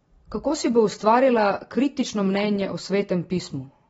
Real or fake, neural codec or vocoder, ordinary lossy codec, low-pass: real; none; AAC, 24 kbps; 19.8 kHz